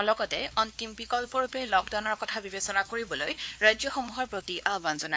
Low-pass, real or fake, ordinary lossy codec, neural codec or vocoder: none; fake; none; codec, 16 kHz, 2 kbps, X-Codec, WavLM features, trained on Multilingual LibriSpeech